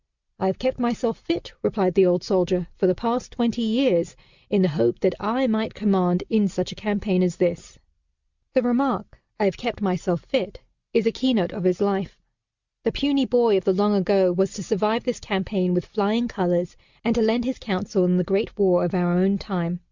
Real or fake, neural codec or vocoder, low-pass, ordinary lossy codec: real; none; 7.2 kHz; Opus, 64 kbps